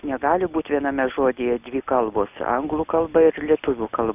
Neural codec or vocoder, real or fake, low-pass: none; real; 3.6 kHz